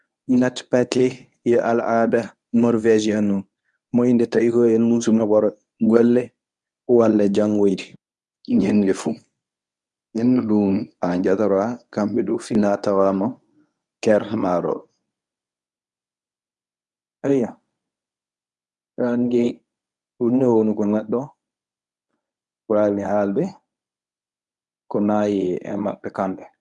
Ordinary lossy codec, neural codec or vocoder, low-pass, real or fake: none; codec, 24 kHz, 0.9 kbps, WavTokenizer, medium speech release version 1; 10.8 kHz; fake